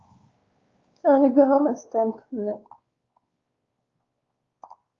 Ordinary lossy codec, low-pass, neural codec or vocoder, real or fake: Opus, 32 kbps; 7.2 kHz; codec, 16 kHz, 2 kbps, X-Codec, WavLM features, trained on Multilingual LibriSpeech; fake